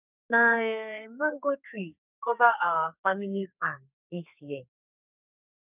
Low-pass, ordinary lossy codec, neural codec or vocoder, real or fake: 3.6 kHz; none; codec, 32 kHz, 1.9 kbps, SNAC; fake